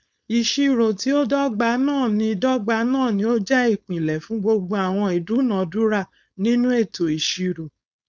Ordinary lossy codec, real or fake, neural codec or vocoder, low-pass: none; fake; codec, 16 kHz, 4.8 kbps, FACodec; none